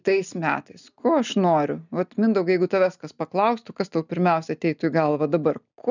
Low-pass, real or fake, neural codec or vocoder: 7.2 kHz; real; none